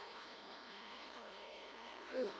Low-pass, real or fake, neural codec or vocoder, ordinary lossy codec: none; fake; codec, 16 kHz, 0.5 kbps, FunCodec, trained on LibriTTS, 25 frames a second; none